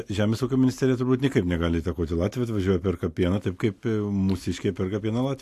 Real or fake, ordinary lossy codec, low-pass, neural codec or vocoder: real; AAC, 64 kbps; 14.4 kHz; none